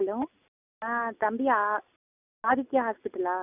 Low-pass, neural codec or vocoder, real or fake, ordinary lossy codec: 3.6 kHz; none; real; none